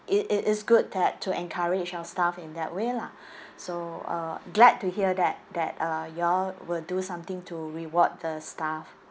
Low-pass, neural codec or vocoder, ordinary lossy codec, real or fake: none; none; none; real